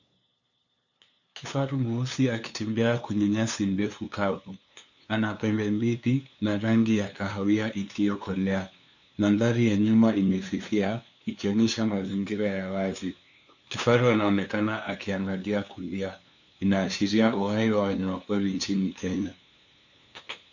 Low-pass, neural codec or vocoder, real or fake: 7.2 kHz; codec, 16 kHz, 2 kbps, FunCodec, trained on LibriTTS, 25 frames a second; fake